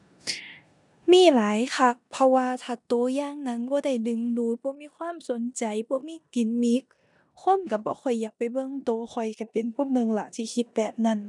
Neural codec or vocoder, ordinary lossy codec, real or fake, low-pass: codec, 16 kHz in and 24 kHz out, 0.9 kbps, LongCat-Audio-Codec, four codebook decoder; none; fake; 10.8 kHz